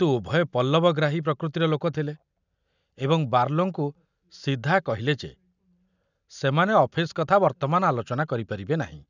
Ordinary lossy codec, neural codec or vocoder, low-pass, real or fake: none; none; 7.2 kHz; real